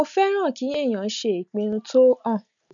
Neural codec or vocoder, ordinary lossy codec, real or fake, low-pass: none; none; real; 7.2 kHz